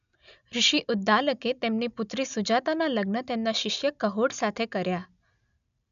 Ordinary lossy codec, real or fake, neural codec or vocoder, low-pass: none; real; none; 7.2 kHz